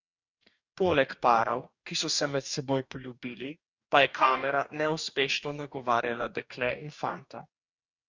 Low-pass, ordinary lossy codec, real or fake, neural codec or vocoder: 7.2 kHz; Opus, 64 kbps; fake; codec, 44.1 kHz, 2.6 kbps, DAC